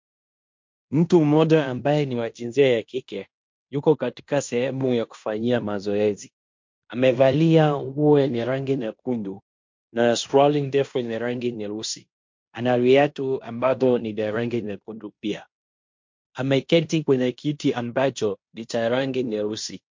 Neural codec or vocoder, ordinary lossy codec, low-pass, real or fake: codec, 16 kHz in and 24 kHz out, 0.9 kbps, LongCat-Audio-Codec, fine tuned four codebook decoder; MP3, 48 kbps; 7.2 kHz; fake